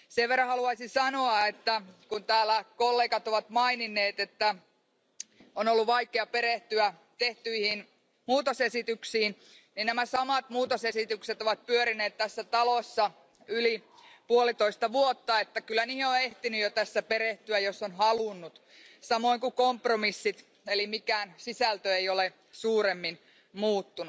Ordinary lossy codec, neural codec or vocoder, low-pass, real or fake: none; none; none; real